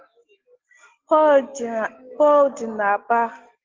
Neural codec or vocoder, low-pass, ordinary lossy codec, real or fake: none; 7.2 kHz; Opus, 16 kbps; real